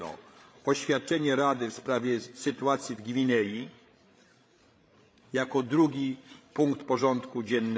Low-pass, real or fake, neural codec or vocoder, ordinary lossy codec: none; fake; codec, 16 kHz, 16 kbps, FreqCodec, larger model; none